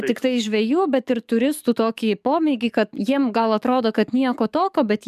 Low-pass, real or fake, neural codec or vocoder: 14.4 kHz; fake; codec, 44.1 kHz, 7.8 kbps, DAC